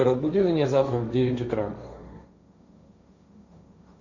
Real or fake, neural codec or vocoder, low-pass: fake; codec, 16 kHz, 1.1 kbps, Voila-Tokenizer; 7.2 kHz